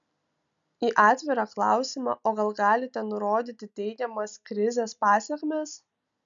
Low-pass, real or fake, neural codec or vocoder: 7.2 kHz; real; none